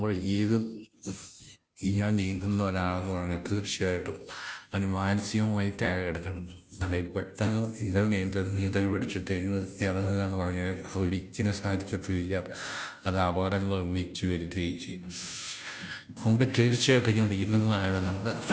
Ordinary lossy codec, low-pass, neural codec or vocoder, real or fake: none; none; codec, 16 kHz, 0.5 kbps, FunCodec, trained on Chinese and English, 25 frames a second; fake